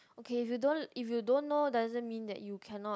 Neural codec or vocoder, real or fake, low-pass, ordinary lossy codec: none; real; none; none